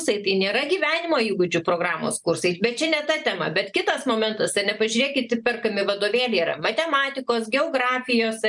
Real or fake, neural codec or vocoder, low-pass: real; none; 10.8 kHz